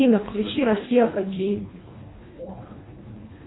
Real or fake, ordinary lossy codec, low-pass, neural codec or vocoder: fake; AAC, 16 kbps; 7.2 kHz; codec, 24 kHz, 1.5 kbps, HILCodec